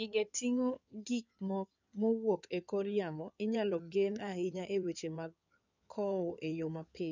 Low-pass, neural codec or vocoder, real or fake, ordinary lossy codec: 7.2 kHz; codec, 16 kHz in and 24 kHz out, 2.2 kbps, FireRedTTS-2 codec; fake; none